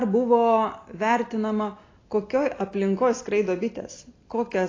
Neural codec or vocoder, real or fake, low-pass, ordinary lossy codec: none; real; 7.2 kHz; AAC, 32 kbps